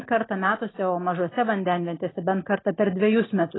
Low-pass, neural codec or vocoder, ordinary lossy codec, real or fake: 7.2 kHz; none; AAC, 16 kbps; real